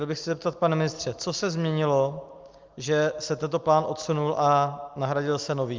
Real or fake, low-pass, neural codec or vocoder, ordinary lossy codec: real; 7.2 kHz; none; Opus, 32 kbps